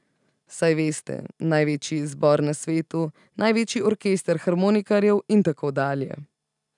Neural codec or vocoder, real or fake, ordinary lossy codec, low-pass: none; real; none; 10.8 kHz